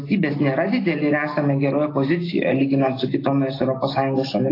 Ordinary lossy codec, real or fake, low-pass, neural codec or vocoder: AAC, 24 kbps; real; 5.4 kHz; none